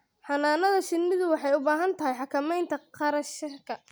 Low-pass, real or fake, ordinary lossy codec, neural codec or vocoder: none; real; none; none